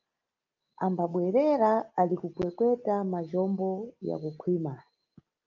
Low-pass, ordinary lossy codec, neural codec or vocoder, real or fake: 7.2 kHz; Opus, 24 kbps; none; real